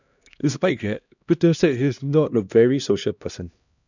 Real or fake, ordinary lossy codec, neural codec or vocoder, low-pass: fake; none; codec, 16 kHz, 1 kbps, X-Codec, HuBERT features, trained on LibriSpeech; 7.2 kHz